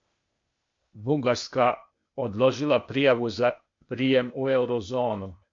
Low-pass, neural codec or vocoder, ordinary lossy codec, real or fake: 7.2 kHz; codec, 16 kHz, 0.8 kbps, ZipCodec; MP3, 48 kbps; fake